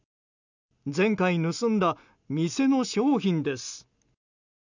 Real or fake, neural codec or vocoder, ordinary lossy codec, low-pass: real; none; none; 7.2 kHz